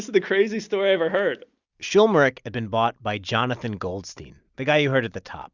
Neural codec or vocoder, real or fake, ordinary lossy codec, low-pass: none; real; Opus, 64 kbps; 7.2 kHz